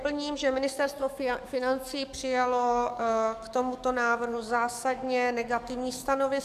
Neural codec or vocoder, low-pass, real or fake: codec, 44.1 kHz, 7.8 kbps, DAC; 14.4 kHz; fake